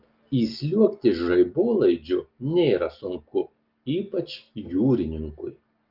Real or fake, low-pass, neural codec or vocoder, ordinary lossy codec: real; 5.4 kHz; none; Opus, 24 kbps